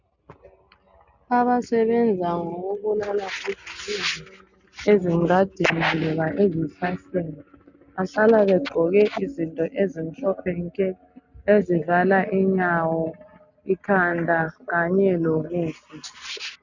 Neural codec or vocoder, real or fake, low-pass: none; real; 7.2 kHz